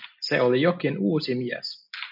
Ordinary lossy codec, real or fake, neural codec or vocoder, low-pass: MP3, 48 kbps; real; none; 5.4 kHz